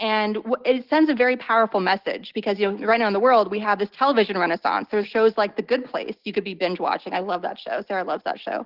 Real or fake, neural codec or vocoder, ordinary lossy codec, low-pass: real; none; Opus, 16 kbps; 5.4 kHz